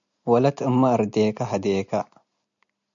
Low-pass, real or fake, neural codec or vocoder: 7.2 kHz; real; none